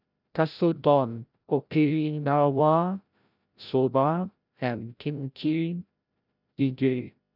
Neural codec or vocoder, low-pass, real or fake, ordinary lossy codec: codec, 16 kHz, 0.5 kbps, FreqCodec, larger model; 5.4 kHz; fake; none